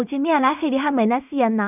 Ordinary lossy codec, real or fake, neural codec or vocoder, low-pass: none; fake; codec, 16 kHz in and 24 kHz out, 0.4 kbps, LongCat-Audio-Codec, two codebook decoder; 3.6 kHz